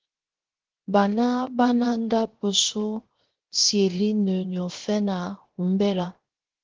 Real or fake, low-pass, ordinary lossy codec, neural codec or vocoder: fake; 7.2 kHz; Opus, 16 kbps; codec, 16 kHz, 0.7 kbps, FocalCodec